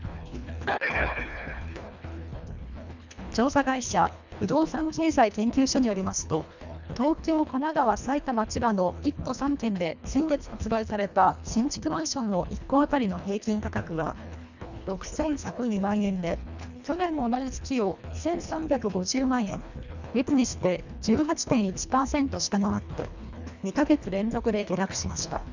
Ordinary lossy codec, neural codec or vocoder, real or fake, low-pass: none; codec, 24 kHz, 1.5 kbps, HILCodec; fake; 7.2 kHz